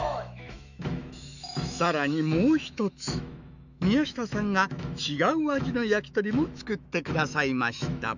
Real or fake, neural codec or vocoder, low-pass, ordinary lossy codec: fake; autoencoder, 48 kHz, 128 numbers a frame, DAC-VAE, trained on Japanese speech; 7.2 kHz; none